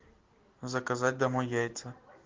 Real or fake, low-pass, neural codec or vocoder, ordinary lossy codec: real; 7.2 kHz; none; Opus, 16 kbps